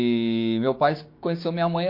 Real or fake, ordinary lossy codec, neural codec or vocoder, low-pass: fake; MP3, 32 kbps; codec, 16 kHz, 6 kbps, DAC; 5.4 kHz